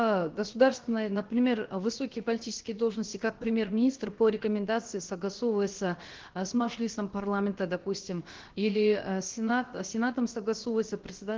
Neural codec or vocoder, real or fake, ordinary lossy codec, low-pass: codec, 16 kHz, about 1 kbps, DyCAST, with the encoder's durations; fake; Opus, 16 kbps; 7.2 kHz